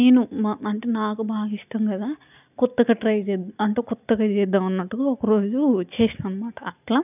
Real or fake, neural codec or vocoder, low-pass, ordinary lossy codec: real; none; 3.6 kHz; none